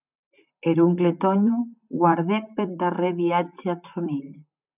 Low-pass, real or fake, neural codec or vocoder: 3.6 kHz; fake; vocoder, 44.1 kHz, 128 mel bands, Pupu-Vocoder